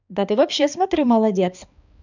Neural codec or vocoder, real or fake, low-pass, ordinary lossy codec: codec, 16 kHz, 2 kbps, X-Codec, HuBERT features, trained on balanced general audio; fake; 7.2 kHz; none